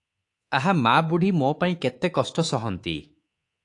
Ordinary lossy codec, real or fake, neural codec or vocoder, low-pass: AAC, 48 kbps; fake; codec, 24 kHz, 3.1 kbps, DualCodec; 10.8 kHz